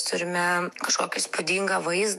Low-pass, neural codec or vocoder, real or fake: 14.4 kHz; autoencoder, 48 kHz, 128 numbers a frame, DAC-VAE, trained on Japanese speech; fake